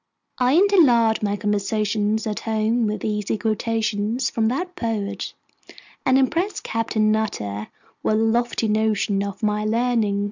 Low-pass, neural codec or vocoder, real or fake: 7.2 kHz; none; real